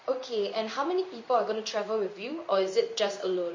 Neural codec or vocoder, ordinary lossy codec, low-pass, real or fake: codec, 16 kHz in and 24 kHz out, 1 kbps, XY-Tokenizer; MP3, 32 kbps; 7.2 kHz; fake